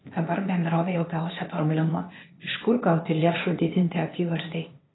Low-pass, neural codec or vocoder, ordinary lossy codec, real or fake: 7.2 kHz; codec, 16 kHz, 0.8 kbps, ZipCodec; AAC, 16 kbps; fake